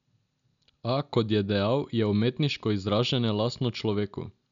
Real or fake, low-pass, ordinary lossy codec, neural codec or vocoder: real; 7.2 kHz; none; none